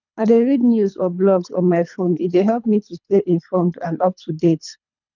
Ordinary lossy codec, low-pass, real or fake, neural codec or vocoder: none; 7.2 kHz; fake; codec, 24 kHz, 3 kbps, HILCodec